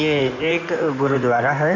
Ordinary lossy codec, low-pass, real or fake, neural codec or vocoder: none; 7.2 kHz; fake; codec, 16 kHz in and 24 kHz out, 2.2 kbps, FireRedTTS-2 codec